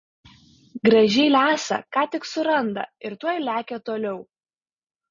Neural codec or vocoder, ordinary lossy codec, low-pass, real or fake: none; MP3, 32 kbps; 7.2 kHz; real